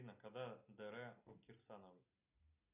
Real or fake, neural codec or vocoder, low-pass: real; none; 3.6 kHz